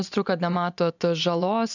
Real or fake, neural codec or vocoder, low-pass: fake; vocoder, 24 kHz, 100 mel bands, Vocos; 7.2 kHz